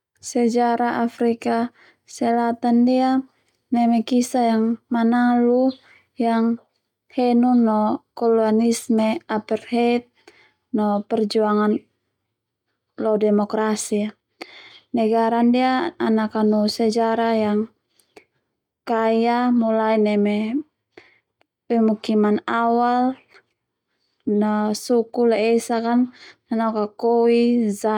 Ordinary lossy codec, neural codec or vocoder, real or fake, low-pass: none; none; real; 19.8 kHz